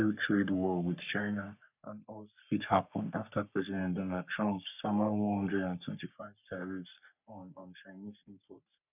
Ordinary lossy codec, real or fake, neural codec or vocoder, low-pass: none; fake; codec, 44.1 kHz, 3.4 kbps, Pupu-Codec; 3.6 kHz